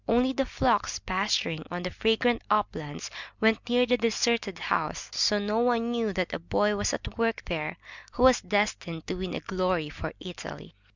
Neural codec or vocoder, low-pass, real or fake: none; 7.2 kHz; real